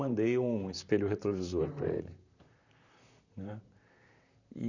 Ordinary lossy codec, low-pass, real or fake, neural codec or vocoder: none; 7.2 kHz; fake; vocoder, 44.1 kHz, 128 mel bands, Pupu-Vocoder